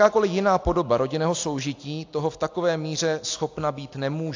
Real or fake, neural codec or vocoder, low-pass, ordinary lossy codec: real; none; 7.2 kHz; AAC, 48 kbps